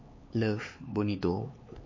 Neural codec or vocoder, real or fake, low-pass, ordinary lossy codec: codec, 16 kHz, 4 kbps, X-Codec, HuBERT features, trained on LibriSpeech; fake; 7.2 kHz; MP3, 32 kbps